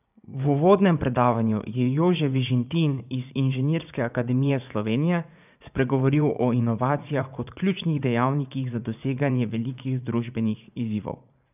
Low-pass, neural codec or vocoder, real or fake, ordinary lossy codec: 3.6 kHz; vocoder, 44.1 kHz, 80 mel bands, Vocos; fake; none